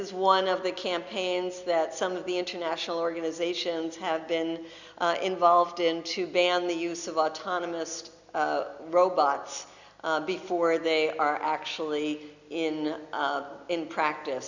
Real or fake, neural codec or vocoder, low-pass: real; none; 7.2 kHz